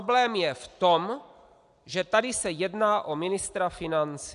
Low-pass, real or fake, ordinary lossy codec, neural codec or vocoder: 10.8 kHz; real; AAC, 96 kbps; none